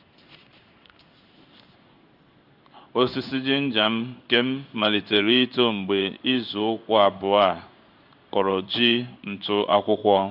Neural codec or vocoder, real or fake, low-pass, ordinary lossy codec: codec, 16 kHz in and 24 kHz out, 1 kbps, XY-Tokenizer; fake; 5.4 kHz; none